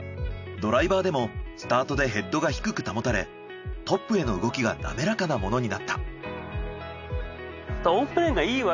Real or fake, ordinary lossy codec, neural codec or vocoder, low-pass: real; none; none; 7.2 kHz